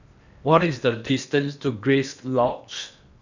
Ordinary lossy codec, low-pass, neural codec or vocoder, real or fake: none; 7.2 kHz; codec, 16 kHz in and 24 kHz out, 0.8 kbps, FocalCodec, streaming, 65536 codes; fake